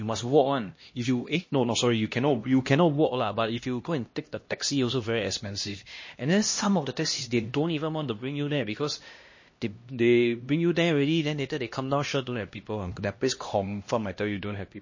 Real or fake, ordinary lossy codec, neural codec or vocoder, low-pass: fake; MP3, 32 kbps; codec, 16 kHz, 1 kbps, X-Codec, HuBERT features, trained on LibriSpeech; 7.2 kHz